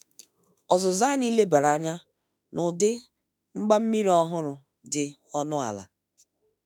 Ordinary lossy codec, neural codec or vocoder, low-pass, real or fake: none; autoencoder, 48 kHz, 32 numbers a frame, DAC-VAE, trained on Japanese speech; none; fake